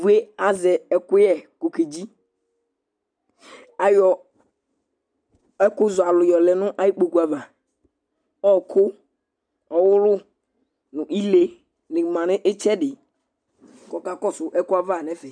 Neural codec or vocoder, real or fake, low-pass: none; real; 9.9 kHz